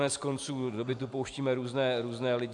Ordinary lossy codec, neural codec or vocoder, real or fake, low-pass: AAC, 64 kbps; none; real; 10.8 kHz